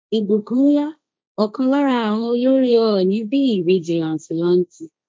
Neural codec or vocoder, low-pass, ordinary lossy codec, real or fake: codec, 16 kHz, 1.1 kbps, Voila-Tokenizer; none; none; fake